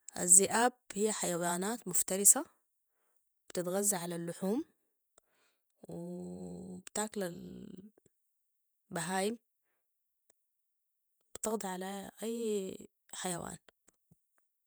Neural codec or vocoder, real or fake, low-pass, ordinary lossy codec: vocoder, 48 kHz, 128 mel bands, Vocos; fake; none; none